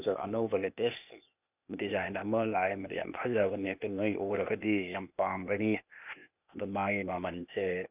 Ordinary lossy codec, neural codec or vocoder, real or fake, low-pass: none; codec, 16 kHz, 0.8 kbps, ZipCodec; fake; 3.6 kHz